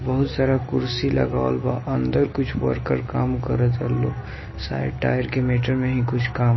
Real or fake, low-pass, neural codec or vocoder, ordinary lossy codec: real; 7.2 kHz; none; MP3, 24 kbps